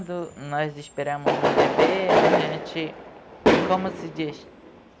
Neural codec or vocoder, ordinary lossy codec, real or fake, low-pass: none; none; real; none